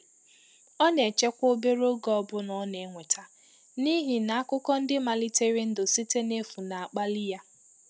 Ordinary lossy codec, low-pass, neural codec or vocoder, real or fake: none; none; none; real